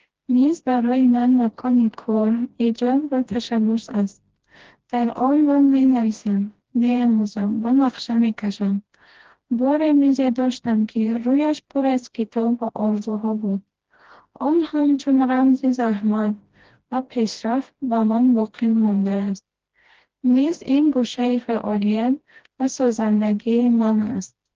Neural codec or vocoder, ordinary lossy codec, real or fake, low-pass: codec, 16 kHz, 1 kbps, FreqCodec, smaller model; Opus, 16 kbps; fake; 7.2 kHz